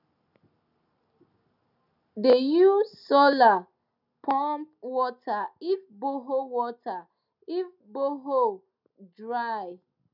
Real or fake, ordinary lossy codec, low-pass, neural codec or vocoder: real; none; 5.4 kHz; none